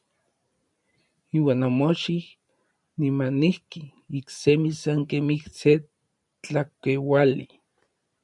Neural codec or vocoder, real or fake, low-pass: vocoder, 44.1 kHz, 128 mel bands every 512 samples, BigVGAN v2; fake; 10.8 kHz